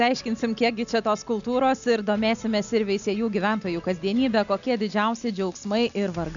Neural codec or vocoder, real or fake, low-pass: none; real; 7.2 kHz